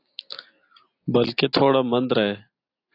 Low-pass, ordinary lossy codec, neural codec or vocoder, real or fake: 5.4 kHz; AAC, 32 kbps; none; real